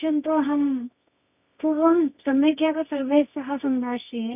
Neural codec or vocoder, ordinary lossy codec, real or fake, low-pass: codec, 24 kHz, 0.9 kbps, WavTokenizer, medium music audio release; none; fake; 3.6 kHz